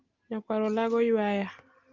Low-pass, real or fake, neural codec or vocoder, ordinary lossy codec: 7.2 kHz; real; none; Opus, 32 kbps